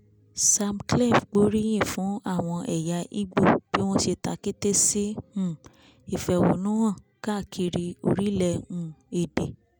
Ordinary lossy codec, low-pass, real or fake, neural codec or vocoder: none; none; real; none